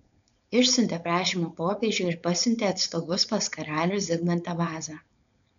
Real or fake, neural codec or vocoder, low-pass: fake; codec, 16 kHz, 4.8 kbps, FACodec; 7.2 kHz